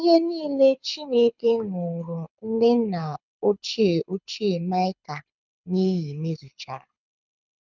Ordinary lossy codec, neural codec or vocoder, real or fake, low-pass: none; codec, 24 kHz, 6 kbps, HILCodec; fake; 7.2 kHz